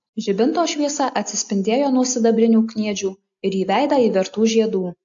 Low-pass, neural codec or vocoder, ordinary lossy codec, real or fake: 7.2 kHz; none; AAC, 64 kbps; real